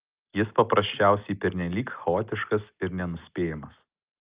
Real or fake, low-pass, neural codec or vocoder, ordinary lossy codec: real; 3.6 kHz; none; Opus, 24 kbps